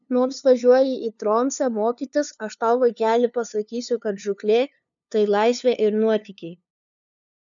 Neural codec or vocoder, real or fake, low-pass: codec, 16 kHz, 2 kbps, FunCodec, trained on LibriTTS, 25 frames a second; fake; 7.2 kHz